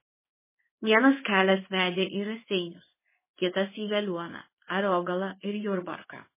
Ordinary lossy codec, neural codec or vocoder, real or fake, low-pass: MP3, 16 kbps; codec, 16 kHz in and 24 kHz out, 1 kbps, XY-Tokenizer; fake; 3.6 kHz